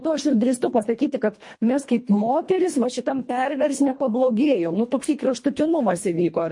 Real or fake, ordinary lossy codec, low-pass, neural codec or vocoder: fake; MP3, 48 kbps; 10.8 kHz; codec, 24 kHz, 1.5 kbps, HILCodec